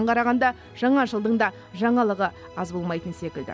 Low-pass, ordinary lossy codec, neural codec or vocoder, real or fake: none; none; none; real